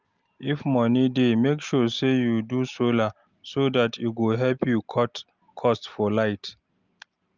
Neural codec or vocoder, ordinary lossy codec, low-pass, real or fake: none; Opus, 24 kbps; 7.2 kHz; real